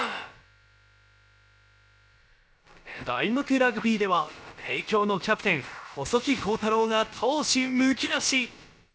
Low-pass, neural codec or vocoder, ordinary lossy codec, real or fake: none; codec, 16 kHz, about 1 kbps, DyCAST, with the encoder's durations; none; fake